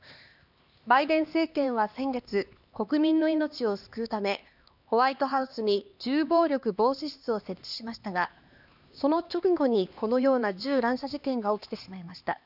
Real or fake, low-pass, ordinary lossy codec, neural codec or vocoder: fake; 5.4 kHz; none; codec, 16 kHz, 2 kbps, X-Codec, HuBERT features, trained on LibriSpeech